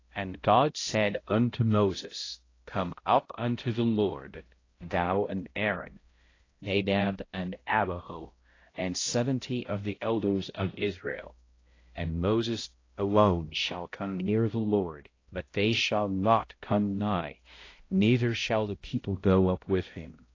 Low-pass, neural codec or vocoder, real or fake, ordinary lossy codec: 7.2 kHz; codec, 16 kHz, 0.5 kbps, X-Codec, HuBERT features, trained on balanced general audio; fake; AAC, 32 kbps